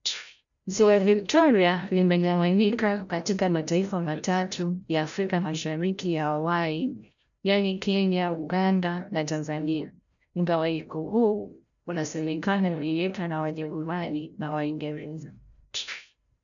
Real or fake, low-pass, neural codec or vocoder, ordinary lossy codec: fake; 7.2 kHz; codec, 16 kHz, 0.5 kbps, FreqCodec, larger model; none